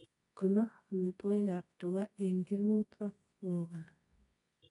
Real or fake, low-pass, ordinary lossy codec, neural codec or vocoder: fake; 10.8 kHz; MP3, 64 kbps; codec, 24 kHz, 0.9 kbps, WavTokenizer, medium music audio release